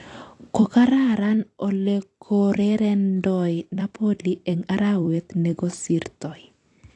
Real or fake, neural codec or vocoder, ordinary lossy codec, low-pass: fake; vocoder, 44.1 kHz, 128 mel bands every 256 samples, BigVGAN v2; AAC, 48 kbps; 10.8 kHz